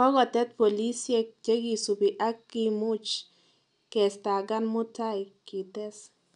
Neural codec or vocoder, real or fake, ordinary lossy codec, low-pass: none; real; none; 10.8 kHz